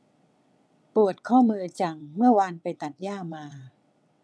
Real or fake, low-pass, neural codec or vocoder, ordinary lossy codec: fake; none; vocoder, 22.05 kHz, 80 mel bands, WaveNeXt; none